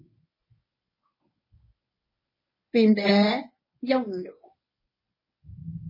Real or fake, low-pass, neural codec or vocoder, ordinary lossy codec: fake; 5.4 kHz; codec, 24 kHz, 0.9 kbps, WavTokenizer, medium speech release version 1; MP3, 24 kbps